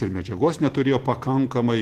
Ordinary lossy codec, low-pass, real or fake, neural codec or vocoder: Opus, 16 kbps; 14.4 kHz; fake; vocoder, 44.1 kHz, 128 mel bands every 512 samples, BigVGAN v2